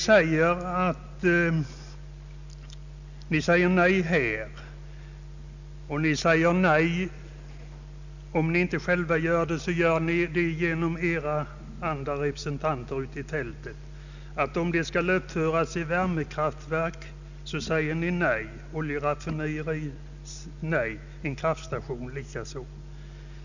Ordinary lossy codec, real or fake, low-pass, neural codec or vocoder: none; real; 7.2 kHz; none